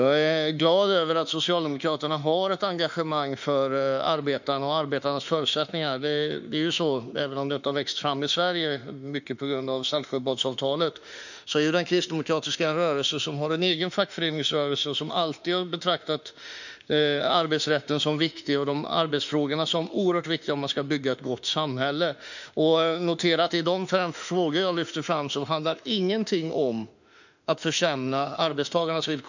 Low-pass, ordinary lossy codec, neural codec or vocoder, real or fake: 7.2 kHz; none; autoencoder, 48 kHz, 32 numbers a frame, DAC-VAE, trained on Japanese speech; fake